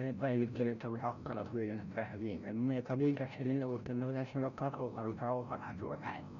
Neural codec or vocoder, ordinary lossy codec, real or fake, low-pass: codec, 16 kHz, 0.5 kbps, FreqCodec, larger model; none; fake; 7.2 kHz